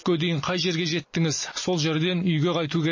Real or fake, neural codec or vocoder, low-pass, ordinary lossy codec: real; none; 7.2 kHz; MP3, 32 kbps